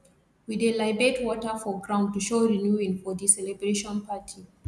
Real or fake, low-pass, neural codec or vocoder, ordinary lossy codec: real; none; none; none